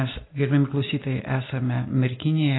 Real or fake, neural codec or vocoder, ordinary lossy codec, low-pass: real; none; AAC, 16 kbps; 7.2 kHz